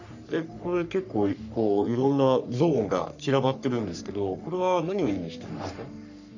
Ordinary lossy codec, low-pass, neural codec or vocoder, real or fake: none; 7.2 kHz; codec, 44.1 kHz, 3.4 kbps, Pupu-Codec; fake